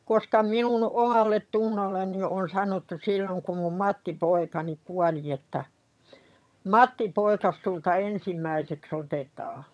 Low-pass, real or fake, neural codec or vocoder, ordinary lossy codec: none; fake; vocoder, 22.05 kHz, 80 mel bands, HiFi-GAN; none